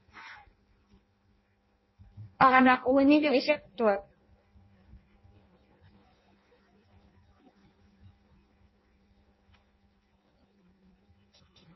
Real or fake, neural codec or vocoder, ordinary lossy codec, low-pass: fake; codec, 16 kHz in and 24 kHz out, 0.6 kbps, FireRedTTS-2 codec; MP3, 24 kbps; 7.2 kHz